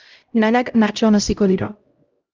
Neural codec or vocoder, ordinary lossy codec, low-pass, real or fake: codec, 16 kHz, 0.5 kbps, X-Codec, HuBERT features, trained on LibriSpeech; Opus, 32 kbps; 7.2 kHz; fake